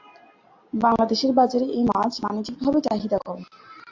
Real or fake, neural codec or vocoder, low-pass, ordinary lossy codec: real; none; 7.2 kHz; AAC, 48 kbps